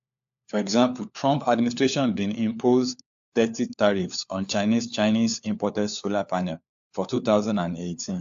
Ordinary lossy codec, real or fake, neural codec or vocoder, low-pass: AAC, 64 kbps; fake; codec, 16 kHz, 4 kbps, FunCodec, trained on LibriTTS, 50 frames a second; 7.2 kHz